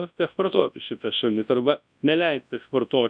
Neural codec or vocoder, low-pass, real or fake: codec, 24 kHz, 0.9 kbps, WavTokenizer, large speech release; 9.9 kHz; fake